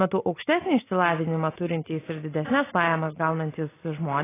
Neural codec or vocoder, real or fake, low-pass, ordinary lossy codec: none; real; 3.6 kHz; AAC, 16 kbps